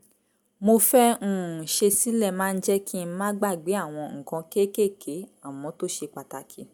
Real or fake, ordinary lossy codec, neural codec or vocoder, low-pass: real; none; none; none